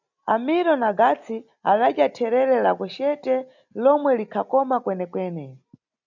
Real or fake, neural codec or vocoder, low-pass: real; none; 7.2 kHz